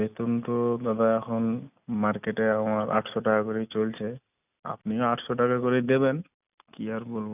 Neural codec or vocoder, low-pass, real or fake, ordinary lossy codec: none; 3.6 kHz; real; none